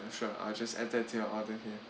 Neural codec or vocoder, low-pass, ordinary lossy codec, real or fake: none; none; none; real